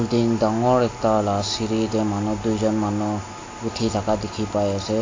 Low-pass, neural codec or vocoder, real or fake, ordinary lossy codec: 7.2 kHz; none; real; AAC, 32 kbps